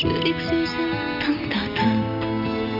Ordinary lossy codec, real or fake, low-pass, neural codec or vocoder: none; real; 5.4 kHz; none